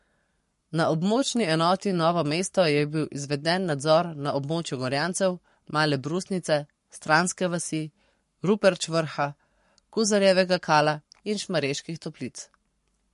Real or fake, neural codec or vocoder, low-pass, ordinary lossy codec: fake; codec, 44.1 kHz, 7.8 kbps, Pupu-Codec; 14.4 kHz; MP3, 48 kbps